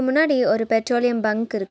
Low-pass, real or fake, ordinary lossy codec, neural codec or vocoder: none; real; none; none